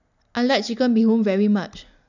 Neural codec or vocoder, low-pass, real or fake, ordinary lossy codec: none; 7.2 kHz; real; none